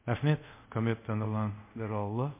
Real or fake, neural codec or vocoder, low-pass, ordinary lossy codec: fake; codec, 24 kHz, 0.5 kbps, DualCodec; 3.6 kHz; MP3, 24 kbps